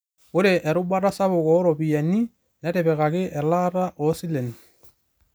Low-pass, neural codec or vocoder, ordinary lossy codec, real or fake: none; none; none; real